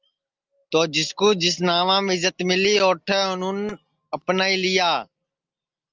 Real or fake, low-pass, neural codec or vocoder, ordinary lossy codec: real; 7.2 kHz; none; Opus, 24 kbps